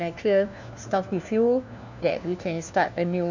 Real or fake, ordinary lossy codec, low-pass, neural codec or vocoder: fake; none; 7.2 kHz; codec, 16 kHz, 1 kbps, FunCodec, trained on LibriTTS, 50 frames a second